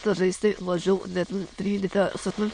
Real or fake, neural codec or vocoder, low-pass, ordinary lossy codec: fake; autoencoder, 22.05 kHz, a latent of 192 numbers a frame, VITS, trained on many speakers; 9.9 kHz; MP3, 48 kbps